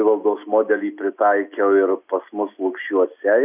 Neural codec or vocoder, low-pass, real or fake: none; 3.6 kHz; real